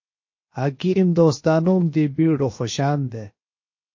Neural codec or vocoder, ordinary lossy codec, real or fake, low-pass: codec, 16 kHz, 0.7 kbps, FocalCodec; MP3, 32 kbps; fake; 7.2 kHz